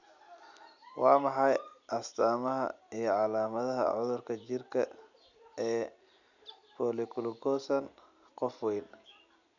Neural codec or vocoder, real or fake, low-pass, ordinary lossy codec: none; real; 7.2 kHz; none